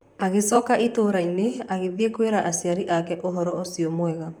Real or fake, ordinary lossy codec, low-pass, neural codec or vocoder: fake; none; 19.8 kHz; vocoder, 44.1 kHz, 128 mel bands, Pupu-Vocoder